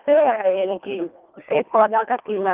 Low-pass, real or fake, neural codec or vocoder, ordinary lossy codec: 3.6 kHz; fake; codec, 24 kHz, 1.5 kbps, HILCodec; Opus, 32 kbps